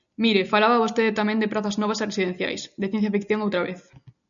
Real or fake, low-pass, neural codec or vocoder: real; 7.2 kHz; none